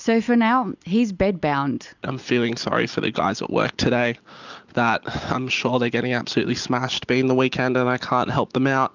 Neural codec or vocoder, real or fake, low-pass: none; real; 7.2 kHz